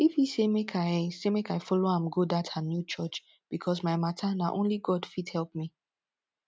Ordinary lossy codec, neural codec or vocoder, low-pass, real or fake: none; none; none; real